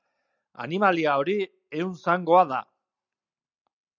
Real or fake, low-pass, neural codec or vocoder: real; 7.2 kHz; none